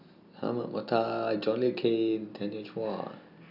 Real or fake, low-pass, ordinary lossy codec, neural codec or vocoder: real; 5.4 kHz; none; none